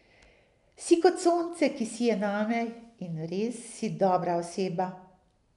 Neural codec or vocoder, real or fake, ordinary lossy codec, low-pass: none; real; none; 10.8 kHz